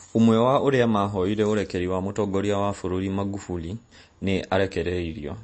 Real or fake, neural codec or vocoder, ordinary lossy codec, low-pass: real; none; MP3, 32 kbps; 9.9 kHz